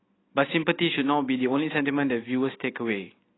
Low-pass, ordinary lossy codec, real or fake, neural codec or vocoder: 7.2 kHz; AAC, 16 kbps; real; none